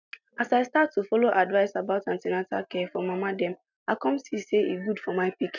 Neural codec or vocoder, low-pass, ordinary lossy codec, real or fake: none; 7.2 kHz; none; real